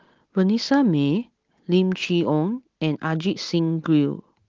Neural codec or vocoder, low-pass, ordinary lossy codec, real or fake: none; 7.2 kHz; Opus, 16 kbps; real